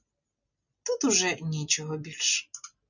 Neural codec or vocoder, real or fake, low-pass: none; real; 7.2 kHz